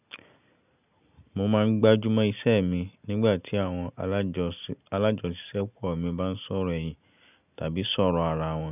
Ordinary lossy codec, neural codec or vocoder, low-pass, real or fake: none; none; 3.6 kHz; real